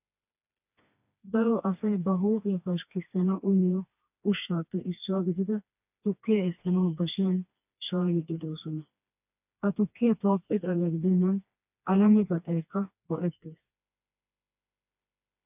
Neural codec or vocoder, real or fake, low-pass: codec, 16 kHz, 2 kbps, FreqCodec, smaller model; fake; 3.6 kHz